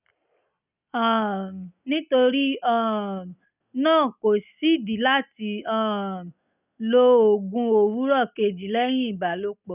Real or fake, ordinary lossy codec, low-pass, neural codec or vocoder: real; none; 3.6 kHz; none